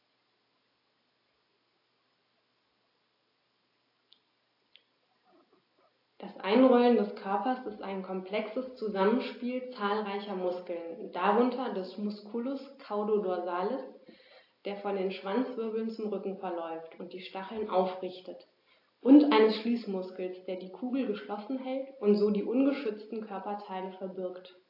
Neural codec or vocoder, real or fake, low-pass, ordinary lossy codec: none; real; 5.4 kHz; AAC, 32 kbps